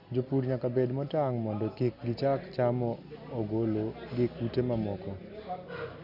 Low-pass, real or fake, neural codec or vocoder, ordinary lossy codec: 5.4 kHz; real; none; none